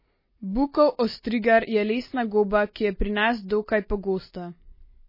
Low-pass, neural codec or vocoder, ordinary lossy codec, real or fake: 5.4 kHz; none; MP3, 24 kbps; real